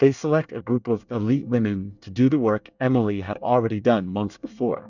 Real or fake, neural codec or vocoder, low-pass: fake; codec, 24 kHz, 1 kbps, SNAC; 7.2 kHz